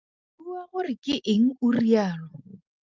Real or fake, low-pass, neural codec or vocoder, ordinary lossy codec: real; 7.2 kHz; none; Opus, 32 kbps